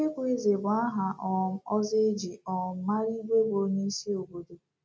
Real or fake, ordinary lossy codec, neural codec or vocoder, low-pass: real; none; none; none